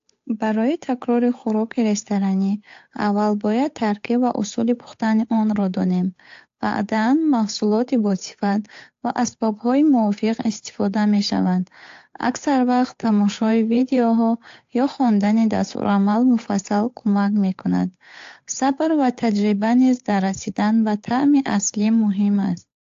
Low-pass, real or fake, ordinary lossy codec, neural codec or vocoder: 7.2 kHz; fake; AAC, 48 kbps; codec, 16 kHz, 8 kbps, FunCodec, trained on Chinese and English, 25 frames a second